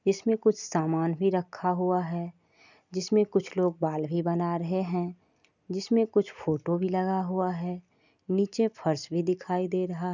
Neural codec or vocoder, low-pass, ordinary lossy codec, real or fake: none; 7.2 kHz; none; real